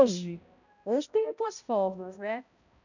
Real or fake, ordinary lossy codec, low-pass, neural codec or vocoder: fake; none; 7.2 kHz; codec, 16 kHz, 0.5 kbps, X-Codec, HuBERT features, trained on balanced general audio